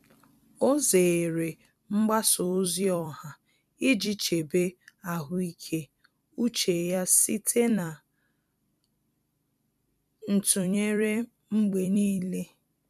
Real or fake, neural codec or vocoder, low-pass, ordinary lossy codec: fake; vocoder, 44.1 kHz, 128 mel bands every 256 samples, BigVGAN v2; 14.4 kHz; none